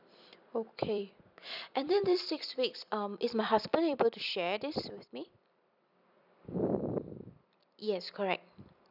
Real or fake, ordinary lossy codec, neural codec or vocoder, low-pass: fake; none; vocoder, 44.1 kHz, 128 mel bands every 256 samples, BigVGAN v2; 5.4 kHz